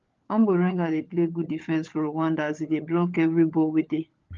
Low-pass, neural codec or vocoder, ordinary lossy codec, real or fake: 7.2 kHz; codec, 16 kHz, 16 kbps, FunCodec, trained on LibriTTS, 50 frames a second; Opus, 32 kbps; fake